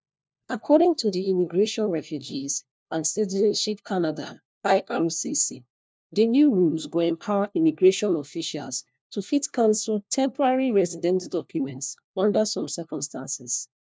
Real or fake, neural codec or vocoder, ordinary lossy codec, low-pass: fake; codec, 16 kHz, 1 kbps, FunCodec, trained on LibriTTS, 50 frames a second; none; none